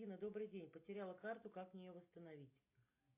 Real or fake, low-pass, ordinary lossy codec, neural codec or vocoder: real; 3.6 kHz; MP3, 24 kbps; none